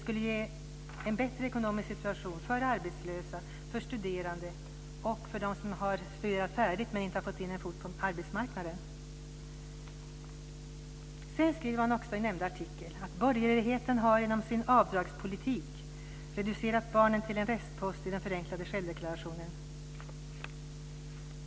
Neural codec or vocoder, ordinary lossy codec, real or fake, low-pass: none; none; real; none